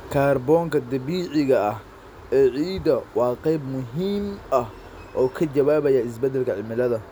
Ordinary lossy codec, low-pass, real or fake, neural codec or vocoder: none; none; real; none